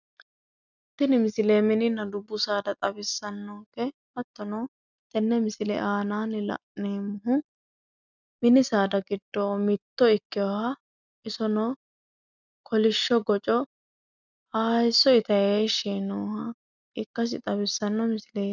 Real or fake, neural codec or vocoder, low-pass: real; none; 7.2 kHz